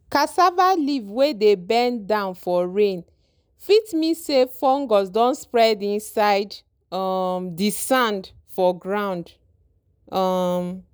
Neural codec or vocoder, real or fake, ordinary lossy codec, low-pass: none; real; none; none